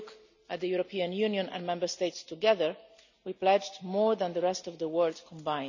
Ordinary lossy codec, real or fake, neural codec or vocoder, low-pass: none; real; none; 7.2 kHz